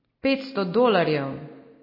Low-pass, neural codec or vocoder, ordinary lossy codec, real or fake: 5.4 kHz; none; MP3, 24 kbps; real